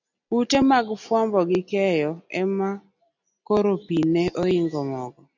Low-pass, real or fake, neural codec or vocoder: 7.2 kHz; real; none